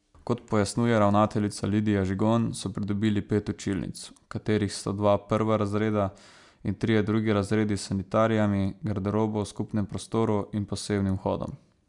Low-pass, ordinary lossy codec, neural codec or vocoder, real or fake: 10.8 kHz; MP3, 96 kbps; none; real